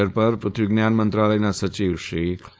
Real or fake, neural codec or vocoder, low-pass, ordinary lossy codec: fake; codec, 16 kHz, 4.8 kbps, FACodec; none; none